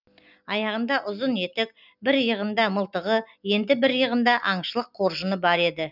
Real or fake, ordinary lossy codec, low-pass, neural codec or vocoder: real; none; 5.4 kHz; none